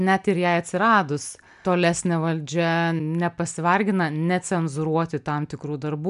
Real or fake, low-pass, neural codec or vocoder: real; 10.8 kHz; none